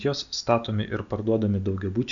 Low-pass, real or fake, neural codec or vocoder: 7.2 kHz; real; none